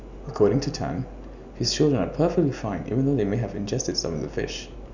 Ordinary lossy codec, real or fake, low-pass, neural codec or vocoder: none; real; 7.2 kHz; none